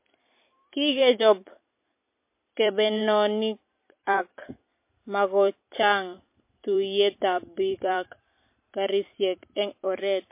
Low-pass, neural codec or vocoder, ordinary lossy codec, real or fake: 3.6 kHz; vocoder, 44.1 kHz, 128 mel bands every 256 samples, BigVGAN v2; MP3, 24 kbps; fake